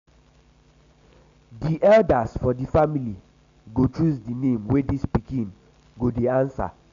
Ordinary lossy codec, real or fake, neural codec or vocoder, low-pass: MP3, 64 kbps; real; none; 7.2 kHz